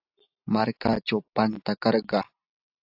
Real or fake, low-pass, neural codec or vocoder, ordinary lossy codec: real; 5.4 kHz; none; AAC, 48 kbps